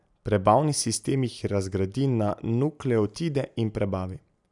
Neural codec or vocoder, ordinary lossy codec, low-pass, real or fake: none; none; 10.8 kHz; real